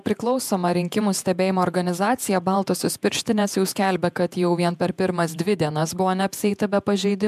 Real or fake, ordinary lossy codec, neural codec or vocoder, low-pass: fake; MP3, 96 kbps; vocoder, 48 kHz, 128 mel bands, Vocos; 14.4 kHz